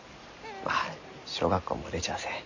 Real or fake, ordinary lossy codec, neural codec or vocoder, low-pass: real; AAC, 48 kbps; none; 7.2 kHz